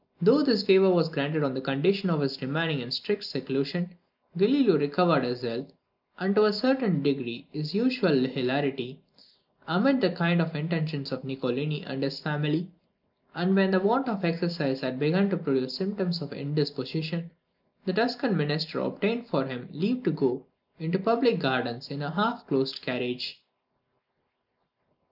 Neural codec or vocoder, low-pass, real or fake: none; 5.4 kHz; real